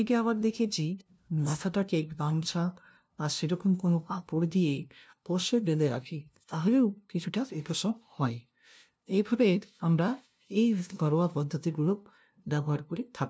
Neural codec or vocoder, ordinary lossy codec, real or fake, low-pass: codec, 16 kHz, 0.5 kbps, FunCodec, trained on LibriTTS, 25 frames a second; none; fake; none